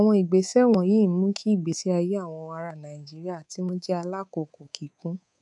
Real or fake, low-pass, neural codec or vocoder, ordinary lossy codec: fake; 10.8 kHz; codec, 24 kHz, 3.1 kbps, DualCodec; none